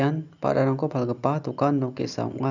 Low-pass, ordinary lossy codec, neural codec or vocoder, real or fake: 7.2 kHz; none; none; real